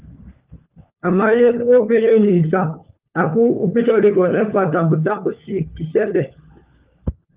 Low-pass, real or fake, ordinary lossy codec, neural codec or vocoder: 3.6 kHz; fake; Opus, 32 kbps; codec, 16 kHz, 4 kbps, FunCodec, trained on LibriTTS, 50 frames a second